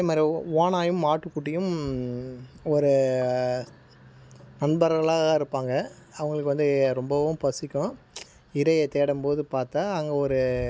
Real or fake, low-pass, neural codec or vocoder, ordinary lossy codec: real; none; none; none